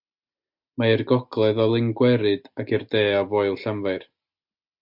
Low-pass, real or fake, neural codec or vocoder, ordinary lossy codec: 5.4 kHz; real; none; MP3, 48 kbps